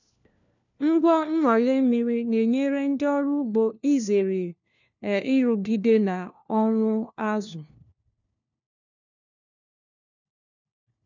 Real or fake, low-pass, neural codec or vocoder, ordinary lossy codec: fake; 7.2 kHz; codec, 16 kHz, 1 kbps, FunCodec, trained on LibriTTS, 50 frames a second; none